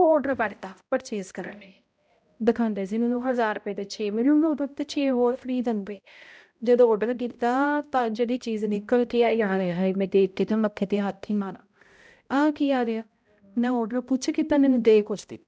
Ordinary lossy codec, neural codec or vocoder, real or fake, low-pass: none; codec, 16 kHz, 0.5 kbps, X-Codec, HuBERT features, trained on balanced general audio; fake; none